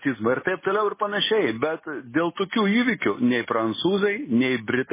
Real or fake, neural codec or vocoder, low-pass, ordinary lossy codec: real; none; 3.6 kHz; MP3, 16 kbps